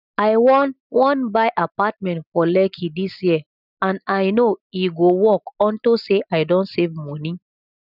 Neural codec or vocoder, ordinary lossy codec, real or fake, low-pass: none; none; real; 5.4 kHz